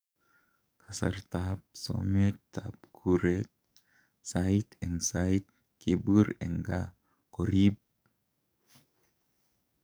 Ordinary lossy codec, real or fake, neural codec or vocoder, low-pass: none; fake; codec, 44.1 kHz, 7.8 kbps, DAC; none